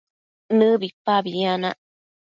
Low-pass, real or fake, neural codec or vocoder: 7.2 kHz; real; none